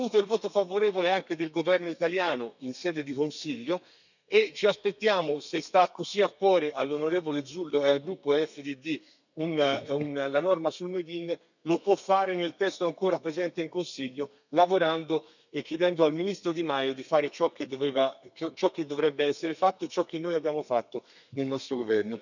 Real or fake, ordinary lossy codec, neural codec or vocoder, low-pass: fake; none; codec, 32 kHz, 1.9 kbps, SNAC; 7.2 kHz